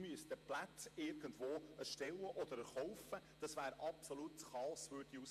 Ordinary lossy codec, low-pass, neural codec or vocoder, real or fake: MP3, 64 kbps; 14.4 kHz; vocoder, 48 kHz, 128 mel bands, Vocos; fake